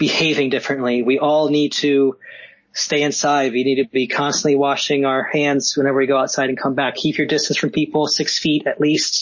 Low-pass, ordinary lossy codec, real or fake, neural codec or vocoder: 7.2 kHz; MP3, 32 kbps; real; none